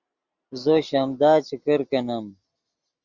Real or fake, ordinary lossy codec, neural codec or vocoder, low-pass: real; Opus, 64 kbps; none; 7.2 kHz